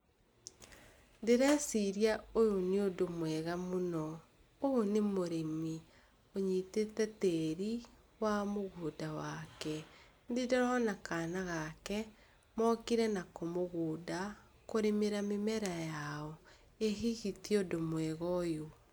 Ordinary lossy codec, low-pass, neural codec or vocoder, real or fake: none; none; none; real